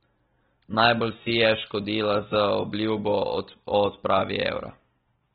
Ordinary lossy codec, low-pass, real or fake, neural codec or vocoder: AAC, 16 kbps; 19.8 kHz; real; none